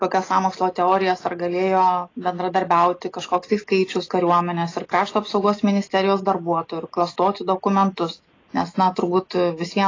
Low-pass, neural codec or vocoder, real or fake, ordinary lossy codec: 7.2 kHz; none; real; AAC, 32 kbps